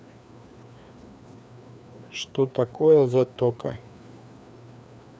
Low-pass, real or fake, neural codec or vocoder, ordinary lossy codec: none; fake; codec, 16 kHz, 2 kbps, FreqCodec, larger model; none